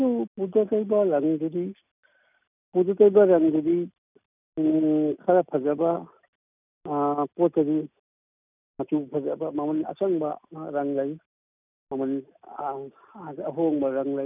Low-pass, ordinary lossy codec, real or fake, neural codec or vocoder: 3.6 kHz; none; real; none